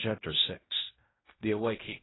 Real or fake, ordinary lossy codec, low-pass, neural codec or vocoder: fake; AAC, 16 kbps; 7.2 kHz; codec, 16 kHz in and 24 kHz out, 0.4 kbps, LongCat-Audio-Codec, fine tuned four codebook decoder